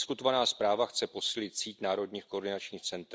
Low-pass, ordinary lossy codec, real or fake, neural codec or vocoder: none; none; real; none